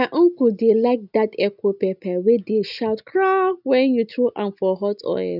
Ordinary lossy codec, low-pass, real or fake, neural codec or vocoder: none; 5.4 kHz; real; none